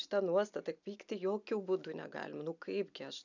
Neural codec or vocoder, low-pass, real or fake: none; 7.2 kHz; real